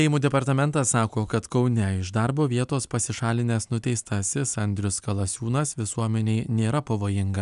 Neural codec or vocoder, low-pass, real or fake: none; 10.8 kHz; real